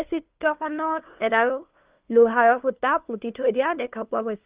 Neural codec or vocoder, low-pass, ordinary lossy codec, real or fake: codec, 16 kHz, 2 kbps, FunCodec, trained on LibriTTS, 25 frames a second; 3.6 kHz; Opus, 32 kbps; fake